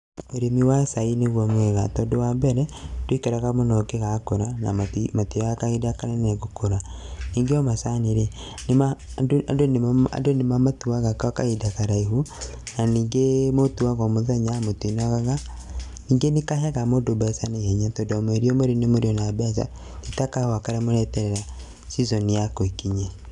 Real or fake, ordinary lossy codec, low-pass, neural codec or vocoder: real; none; 10.8 kHz; none